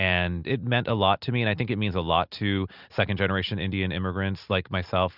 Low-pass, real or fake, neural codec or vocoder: 5.4 kHz; real; none